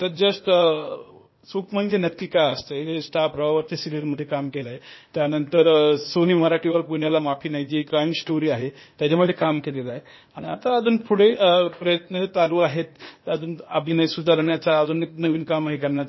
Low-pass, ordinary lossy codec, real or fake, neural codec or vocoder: 7.2 kHz; MP3, 24 kbps; fake; codec, 16 kHz, 0.8 kbps, ZipCodec